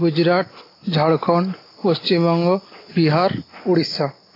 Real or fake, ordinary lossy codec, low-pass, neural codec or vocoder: real; AAC, 24 kbps; 5.4 kHz; none